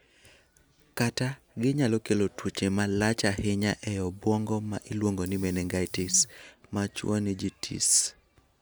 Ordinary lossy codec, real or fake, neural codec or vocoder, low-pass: none; real; none; none